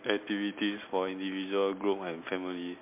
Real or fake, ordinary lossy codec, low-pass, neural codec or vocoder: real; MP3, 32 kbps; 3.6 kHz; none